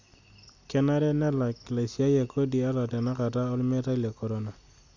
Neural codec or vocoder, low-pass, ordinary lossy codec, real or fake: none; 7.2 kHz; none; real